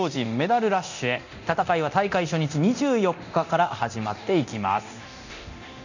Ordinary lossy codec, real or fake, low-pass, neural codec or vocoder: none; fake; 7.2 kHz; codec, 24 kHz, 0.9 kbps, DualCodec